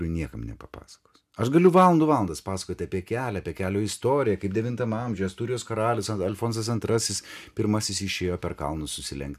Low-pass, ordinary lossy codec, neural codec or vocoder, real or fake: 14.4 kHz; MP3, 96 kbps; none; real